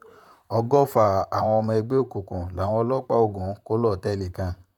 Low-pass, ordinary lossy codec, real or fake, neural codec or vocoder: 19.8 kHz; none; fake; vocoder, 44.1 kHz, 128 mel bands, Pupu-Vocoder